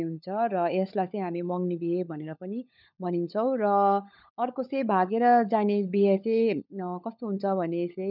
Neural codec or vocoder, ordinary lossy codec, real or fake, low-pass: codec, 16 kHz, 16 kbps, FunCodec, trained on LibriTTS, 50 frames a second; none; fake; 5.4 kHz